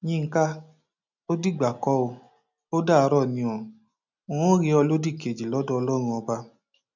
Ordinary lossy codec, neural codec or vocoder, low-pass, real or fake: none; none; 7.2 kHz; real